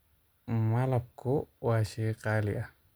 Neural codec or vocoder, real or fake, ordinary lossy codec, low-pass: none; real; none; none